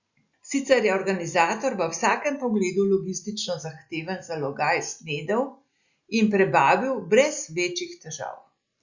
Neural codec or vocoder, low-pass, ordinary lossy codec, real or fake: none; 7.2 kHz; Opus, 64 kbps; real